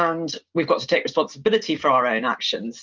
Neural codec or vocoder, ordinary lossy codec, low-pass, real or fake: none; Opus, 24 kbps; 7.2 kHz; real